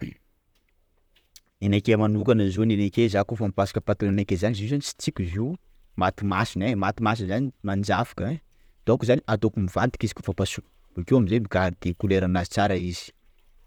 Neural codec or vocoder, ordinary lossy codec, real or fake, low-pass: vocoder, 44.1 kHz, 128 mel bands, Pupu-Vocoder; none; fake; 19.8 kHz